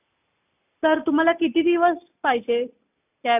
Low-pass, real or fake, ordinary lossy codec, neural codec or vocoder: 3.6 kHz; real; none; none